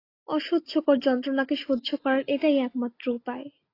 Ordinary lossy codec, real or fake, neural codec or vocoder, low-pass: AAC, 32 kbps; real; none; 5.4 kHz